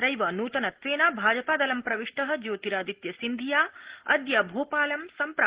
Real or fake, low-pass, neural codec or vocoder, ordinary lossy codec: real; 3.6 kHz; none; Opus, 16 kbps